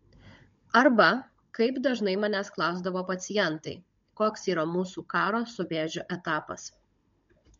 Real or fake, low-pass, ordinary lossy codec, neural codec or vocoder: fake; 7.2 kHz; MP3, 48 kbps; codec, 16 kHz, 16 kbps, FunCodec, trained on Chinese and English, 50 frames a second